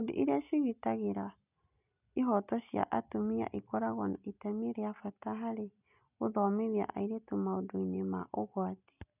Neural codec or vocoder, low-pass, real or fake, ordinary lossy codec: none; 3.6 kHz; real; none